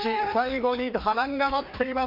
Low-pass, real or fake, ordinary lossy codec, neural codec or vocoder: 5.4 kHz; fake; MP3, 48 kbps; codec, 16 kHz, 2 kbps, FreqCodec, larger model